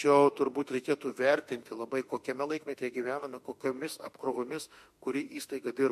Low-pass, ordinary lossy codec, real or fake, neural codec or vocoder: 14.4 kHz; MP3, 64 kbps; fake; autoencoder, 48 kHz, 32 numbers a frame, DAC-VAE, trained on Japanese speech